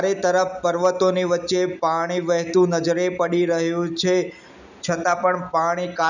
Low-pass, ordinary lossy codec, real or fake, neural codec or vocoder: 7.2 kHz; none; real; none